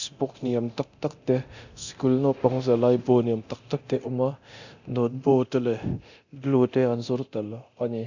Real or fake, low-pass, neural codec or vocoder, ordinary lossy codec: fake; 7.2 kHz; codec, 24 kHz, 0.9 kbps, DualCodec; none